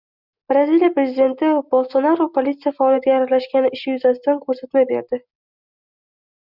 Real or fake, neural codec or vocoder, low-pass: real; none; 5.4 kHz